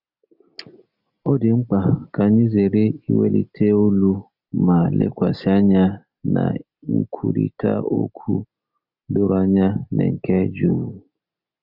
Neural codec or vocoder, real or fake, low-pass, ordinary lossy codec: none; real; 5.4 kHz; none